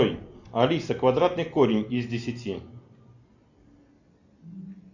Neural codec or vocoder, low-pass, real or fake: none; 7.2 kHz; real